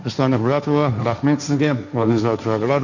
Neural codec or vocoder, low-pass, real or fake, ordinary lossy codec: codec, 16 kHz, 1.1 kbps, Voila-Tokenizer; 7.2 kHz; fake; none